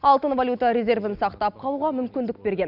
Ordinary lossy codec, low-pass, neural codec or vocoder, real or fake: none; 5.4 kHz; none; real